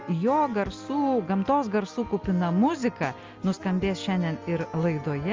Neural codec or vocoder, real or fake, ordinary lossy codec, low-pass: none; real; Opus, 32 kbps; 7.2 kHz